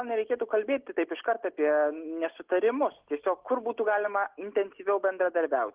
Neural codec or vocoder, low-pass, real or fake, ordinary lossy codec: none; 3.6 kHz; real; Opus, 16 kbps